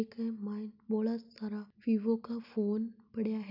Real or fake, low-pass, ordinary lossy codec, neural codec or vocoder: real; 5.4 kHz; none; none